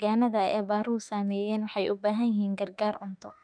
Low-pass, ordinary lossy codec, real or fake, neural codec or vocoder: 9.9 kHz; none; fake; autoencoder, 48 kHz, 32 numbers a frame, DAC-VAE, trained on Japanese speech